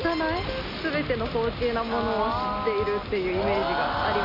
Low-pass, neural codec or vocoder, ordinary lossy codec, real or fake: 5.4 kHz; none; none; real